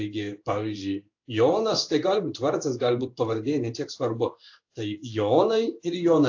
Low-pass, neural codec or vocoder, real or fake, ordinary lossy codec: 7.2 kHz; codec, 16 kHz in and 24 kHz out, 1 kbps, XY-Tokenizer; fake; AAC, 48 kbps